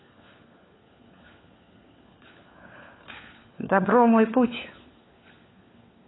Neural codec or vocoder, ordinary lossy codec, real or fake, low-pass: codec, 16 kHz, 4 kbps, FunCodec, trained on LibriTTS, 50 frames a second; AAC, 16 kbps; fake; 7.2 kHz